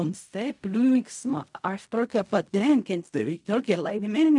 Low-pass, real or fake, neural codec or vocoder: 10.8 kHz; fake; codec, 16 kHz in and 24 kHz out, 0.4 kbps, LongCat-Audio-Codec, fine tuned four codebook decoder